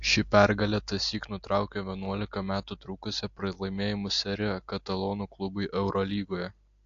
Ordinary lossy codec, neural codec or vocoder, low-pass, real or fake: AAC, 48 kbps; none; 7.2 kHz; real